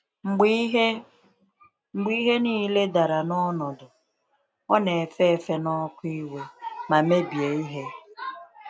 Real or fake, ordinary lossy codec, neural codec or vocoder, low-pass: real; none; none; none